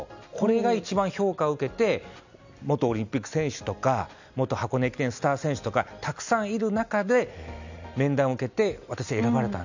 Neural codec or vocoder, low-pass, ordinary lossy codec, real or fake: none; 7.2 kHz; none; real